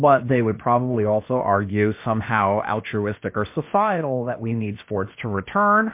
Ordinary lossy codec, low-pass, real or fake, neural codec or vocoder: MP3, 24 kbps; 3.6 kHz; fake; codec, 16 kHz, about 1 kbps, DyCAST, with the encoder's durations